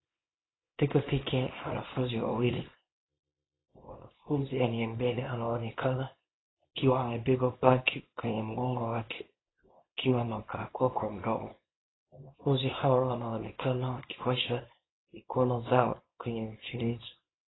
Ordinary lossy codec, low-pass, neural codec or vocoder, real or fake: AAC, 16 kbps; 7.2 kHz; codec, 24 kHz, 0.9 kbps, WavTokenizer, small release; fake